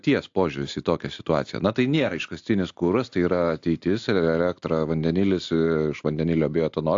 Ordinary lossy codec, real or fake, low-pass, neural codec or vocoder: AAC, 64 kbps; real; 7.2 kHz; none